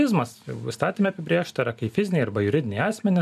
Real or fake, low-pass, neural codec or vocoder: real; 14.4 kHz; none